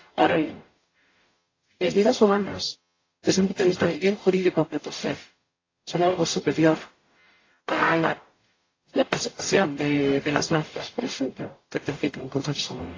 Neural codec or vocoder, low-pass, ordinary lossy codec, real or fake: codec, 44.1 kHz, 0.9 kbps, DAC; 7.2 kHz; AAC, 32 kbps; fake